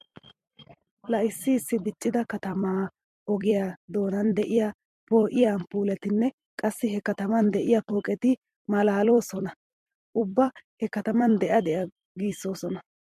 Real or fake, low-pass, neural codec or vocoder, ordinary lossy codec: fake; 14.4 kHz; vocoder, 44.1 kHz, 128 mel bands every 256 samples, BigVGAN v2; MP3, 64 kbps